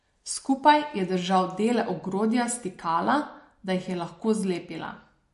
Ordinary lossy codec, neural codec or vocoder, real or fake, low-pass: MP3, 48 kbps; none; real; 14.4 kHz